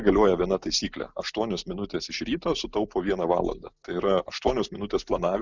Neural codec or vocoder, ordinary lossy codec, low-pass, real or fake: none; Opus, 64 kbps; 7.2 kHz; real